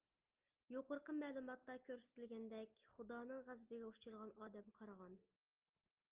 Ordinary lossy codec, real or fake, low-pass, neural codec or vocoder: Opus, 32 kbps; real; 3.6 kHz; none